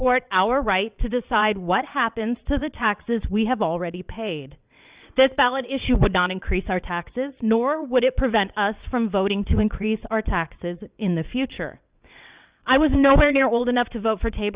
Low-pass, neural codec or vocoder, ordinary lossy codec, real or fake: 3.6 kHz; vocoder, 22.05 kHz, 80 mel bands, WaveNeXt; Opus, 64 kbps; fake